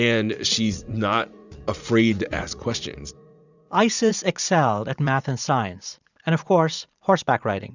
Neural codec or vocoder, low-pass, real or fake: vocoder, 44.1 kHz, 80 mel bands, Vocos; 7.2 kHz; fake